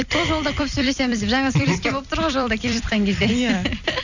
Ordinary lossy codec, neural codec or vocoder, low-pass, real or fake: none; vocoder, 44.1 kHz, 128 mel bands every 256 samples, BigVGAN v2; 7.2 kHz; fake